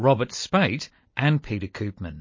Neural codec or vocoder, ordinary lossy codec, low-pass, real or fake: none; MP3, 32 kbps; 7.2 kHz; real